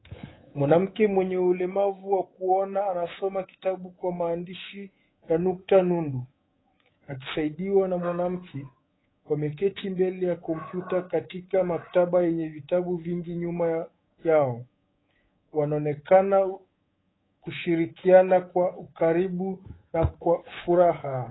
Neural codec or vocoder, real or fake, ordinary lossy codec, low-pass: codec, 24 kHz, 3.1 kbps, DualCodec; fake; AAC, 16 kbps; 7.2 kHz